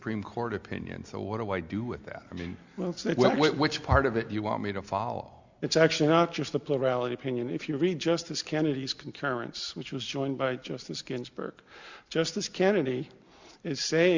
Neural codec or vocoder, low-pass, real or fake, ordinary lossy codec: none; 7.2 kHz; real; Opus, 64 kbps